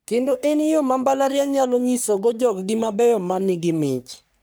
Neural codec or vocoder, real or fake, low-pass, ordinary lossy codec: codec, 44.1 kHz, 3.4 kbps, Pupu-Codec; fake; none; none